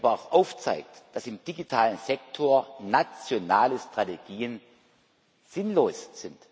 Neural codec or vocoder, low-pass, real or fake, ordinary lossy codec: none; none; real; none